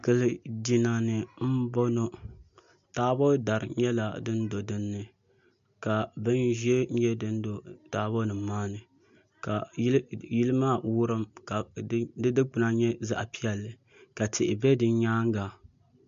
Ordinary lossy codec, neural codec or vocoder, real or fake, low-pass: AAC, 64 kbps; none; real; 7.2 kHz